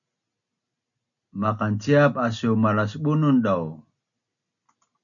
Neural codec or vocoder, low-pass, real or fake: none; 7.2 kHz; real